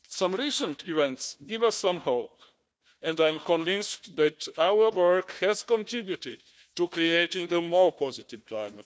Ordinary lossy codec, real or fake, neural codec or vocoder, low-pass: none; fake; codec, 16 kHz, 1 kbps, FunCodec, trained on Chinese and English, 50 frames a second; none